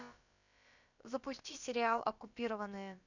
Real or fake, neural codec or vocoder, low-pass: fake; codec, 16 kHz, about 1 kbps, DyCAST, with the encoder's durations; 7.2 kHz